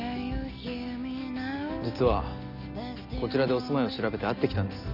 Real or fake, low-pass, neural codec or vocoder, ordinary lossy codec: real; 5.4 kHz; none; none